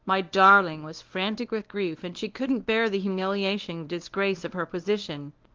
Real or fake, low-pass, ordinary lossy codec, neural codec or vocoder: fake; 7.2 kHz; Opus, 32 kbps; codec, 24 kHz, 0.9 kbps, WavTokenizer, medium speech release version 1